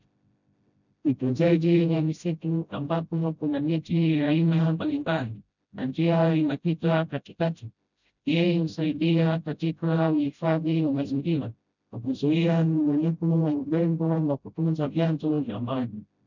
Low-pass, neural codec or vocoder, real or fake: 7.2 kHz; codec, 16 kHz, 0.5 kbps, FreqCodec, smaller model; fake